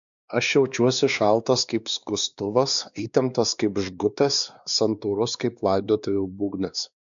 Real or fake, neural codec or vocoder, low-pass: fake; codec, 16 kHz, 2 kbps, X-Codec, WavLM features, trained on Multilingual LibriSpeech; 7.2 kHz